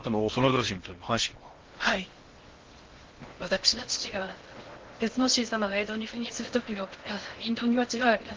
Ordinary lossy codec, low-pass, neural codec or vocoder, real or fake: Opus, 16 kbps; 7.2 kHz; codec, 16 kHz in and 24 kHz out, 0.6 kbps, FocalCodec, streaming, 4096 codes; fake